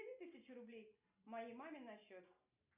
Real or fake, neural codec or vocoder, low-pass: real; none; 3.6 kHz